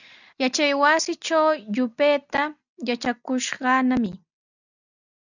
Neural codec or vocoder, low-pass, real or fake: none; 7.2 kHz; real